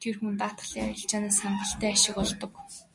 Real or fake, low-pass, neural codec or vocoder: real; 10.8 kHz; none